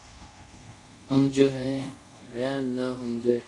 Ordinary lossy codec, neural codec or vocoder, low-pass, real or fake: MP3, 48 kbps; codec, 24 kHz, 0.5 kbps, DualCodec; 10.8 kHz; fake